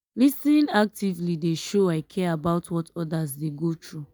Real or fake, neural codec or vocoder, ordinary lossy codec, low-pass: real; none; none; none